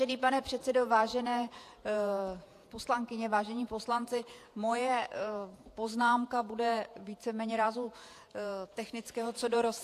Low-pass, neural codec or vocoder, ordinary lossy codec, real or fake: 14.4 kHz; vocoder, 48 kHz, 128 mel bands, Vocos; AAC, 64 kbps; fake